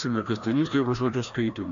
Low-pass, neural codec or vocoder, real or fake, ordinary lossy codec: 7.2 kHz; codec, 16 kHz, 1 kbps, FreqCodec, larger model; fake; AAC, 64 kbps